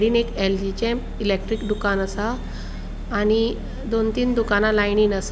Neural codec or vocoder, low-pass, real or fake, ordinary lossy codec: none; none; real; none